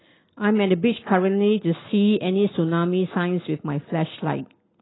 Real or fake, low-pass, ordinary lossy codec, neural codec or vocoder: real; 7.2 kHz; AAC, 16 kbps; none